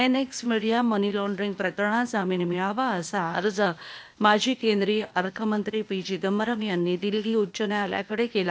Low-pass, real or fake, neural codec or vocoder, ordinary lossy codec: none; fake; codec, 16 kHz, 0.8 kbps, ZipCodec; none